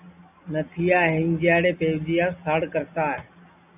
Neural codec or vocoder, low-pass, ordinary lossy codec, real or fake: none; 3.6 kHz; AAC, 32 kbps; real